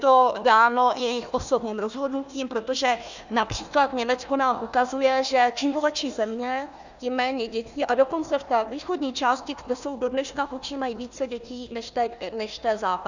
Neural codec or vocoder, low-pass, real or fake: codec, 16 kHz, 1 kbps, FunCodec, trained on Chinese and English, 50 frames a second; 7.2 kHz; fake